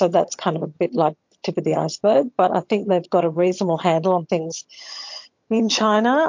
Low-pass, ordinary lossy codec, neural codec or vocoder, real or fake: 7.2 kHz; MP3, 48 kbps; vocoder, 22.05 kHz, 80 mel bands, HiFi-GAN; fake